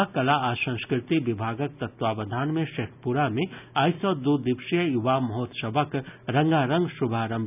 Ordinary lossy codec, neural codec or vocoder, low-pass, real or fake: none; none; 3.6 kHz; real